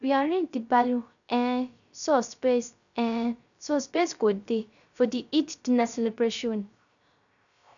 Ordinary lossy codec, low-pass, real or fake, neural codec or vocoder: none; 7.2 kHz; fake; codec, 16 kHz, 0.3 kbps, FocalCodec